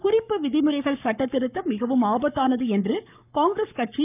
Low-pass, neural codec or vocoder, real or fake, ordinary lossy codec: 3.6 kHz; codec, 44.1 kHz, 7.8 kbps, Pupu-Codec; fake; none